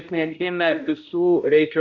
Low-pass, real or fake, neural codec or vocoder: 7.2 kHz; fake; codec, 16 kHz, 0.5 kbps, X-Codec, HuBERT features, trained on balanced general audio